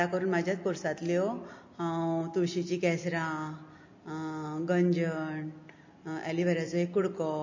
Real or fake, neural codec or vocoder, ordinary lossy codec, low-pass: real; none; MP3, 32 kbps; 7.2 kHz